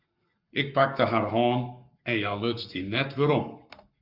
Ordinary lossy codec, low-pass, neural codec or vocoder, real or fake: Opus, 64 kbps; 5.4 kHz; codec, 44.1 kHz, 7.8 kbps, Pupu-Codec; fake